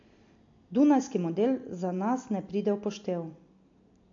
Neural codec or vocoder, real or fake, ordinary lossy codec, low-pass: none; real; AAC, 48 kbps; 7.2 kHz